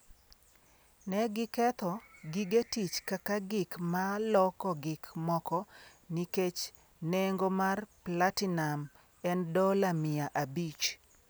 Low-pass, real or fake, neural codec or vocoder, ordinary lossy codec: none; real; none; none